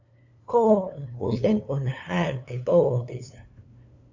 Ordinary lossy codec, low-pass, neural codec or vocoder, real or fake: AAC, 48 kbps; 7.2 kHz; codec, 16 kHz, 2 kbps, FunCodec, trained on LibriTTS, 25 frames a second; fake